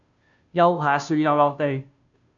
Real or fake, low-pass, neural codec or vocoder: fake; 7.2 kHz; codec, 16 kHz, 0.5 kbps, FunCodec, trained on Chinese and English, 25 frames a second